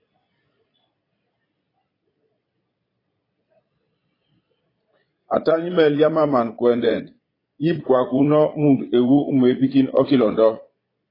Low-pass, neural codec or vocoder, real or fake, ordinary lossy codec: 5.4 kHz; vocoder, 22.05 kHz, 80 mel bands, Vocos; fake; AAC, 24 kbps